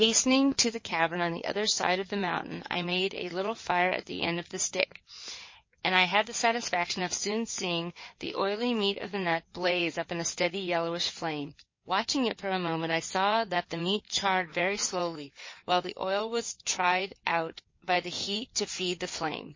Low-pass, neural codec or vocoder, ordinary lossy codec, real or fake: 7.2 kHz; codec, 16 kHz in and 24 kHz out, 2.2 kbps, FireRedTTS-2 codec; MP3, 32 kbps; fake